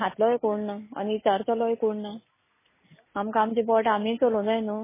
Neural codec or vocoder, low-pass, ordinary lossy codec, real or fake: none; 3.6 kHz; MP3, 16 kbps; real